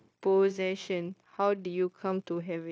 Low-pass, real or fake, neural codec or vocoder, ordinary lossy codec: none; fake; codec, 16 kHz, 0.9 kbps, LongCat-Audio-Codec; none